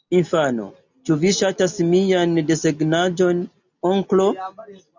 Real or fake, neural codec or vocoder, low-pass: real; none; 7.2 kHz